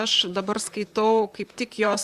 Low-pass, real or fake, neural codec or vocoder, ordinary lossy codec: 14.4 kHz; fake; vocoder, 44.1 kHz, 128 mel bands, Pupu-Vocoder; Opus, 64 kbps